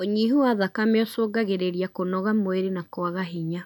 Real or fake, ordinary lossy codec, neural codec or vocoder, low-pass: real; MP3, 96 kbps; none; 19.8 kHz